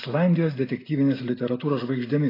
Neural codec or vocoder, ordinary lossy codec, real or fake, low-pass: none; AAC, 24 kbps; real; 5.4 kHz